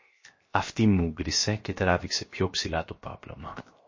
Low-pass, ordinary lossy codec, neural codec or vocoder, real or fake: 7.2 kHz; MP3, 32 kbps; codec, 16 kHz, 0.7 kbps, FocalCodec; fake